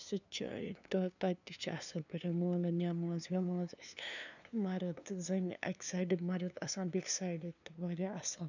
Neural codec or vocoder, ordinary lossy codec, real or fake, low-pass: codec, 16 kHz, 2 kbps, FunCodec, trained on LibriTTS, 25 frames a second; none; fake; 7.2 kHz